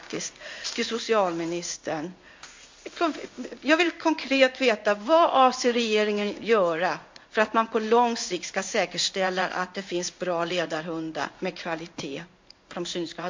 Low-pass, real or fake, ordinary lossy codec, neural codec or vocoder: 7.2 kHz; fake; MP3, 48 kbps; codec, 16 kHz in and 24 kHz out, 1 kbps, XY-Tokenizer